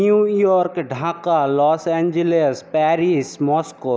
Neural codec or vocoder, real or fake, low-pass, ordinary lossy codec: none; real; none; none